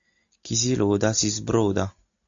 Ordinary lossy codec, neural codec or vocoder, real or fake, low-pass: AAC, 64 kbps; none; real; 7.2 kHz